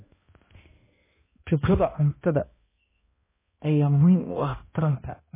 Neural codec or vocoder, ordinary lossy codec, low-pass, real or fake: codec, 16 kHz, 1 kbps, X-Codec, HuBERT features, trained on balanced general audio; MP3, 16 kbps; 3.6 kHz; fake